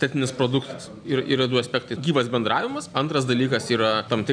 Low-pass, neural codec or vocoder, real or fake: 9.9 kHz; none; real